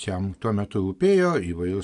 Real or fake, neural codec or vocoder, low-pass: real; none; 10.8 kHz